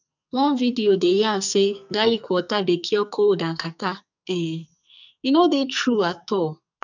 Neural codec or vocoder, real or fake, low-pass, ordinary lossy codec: codec, 44.1 kHz, 2.6 kbps, SNAC; fake; 7.2 kHz; none